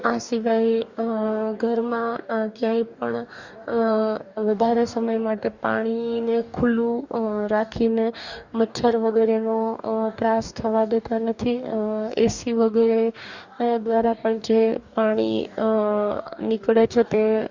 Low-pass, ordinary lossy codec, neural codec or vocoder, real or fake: 7.2 kHz; Opus, 64 kbps; codec, 44.1 kHz, 2.6 kbps, DAC; fake